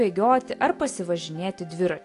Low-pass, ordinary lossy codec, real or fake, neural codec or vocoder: 10.8 kHz; AAC, 48 kbps; real; none